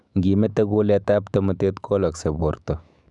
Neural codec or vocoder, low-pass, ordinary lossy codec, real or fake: autoencoder, 48 kHz, 128 numbers a frame, DAC-VAE, trained on Japanese speech; 10.8 kHz; none; fake